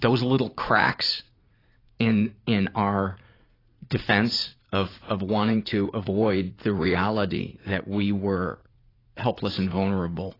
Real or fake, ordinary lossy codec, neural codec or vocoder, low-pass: fake; AAC, 24 kbps; vocoder, 44.1 kHz, 80 mel bands, Vocos; 5.4 kHz